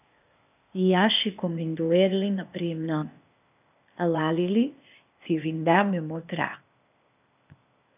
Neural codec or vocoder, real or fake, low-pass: codec, 16 kHz, 0.8 kbps, ZipCodec; fake; 3.6 kHz